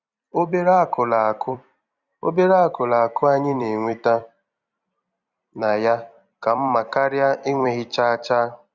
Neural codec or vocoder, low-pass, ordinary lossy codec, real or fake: vocoder, 44.1 kHz, 128 mel bands every 256 samples, BigVGAN v2; 7.2 kHz; Opus, 64 kbps; fake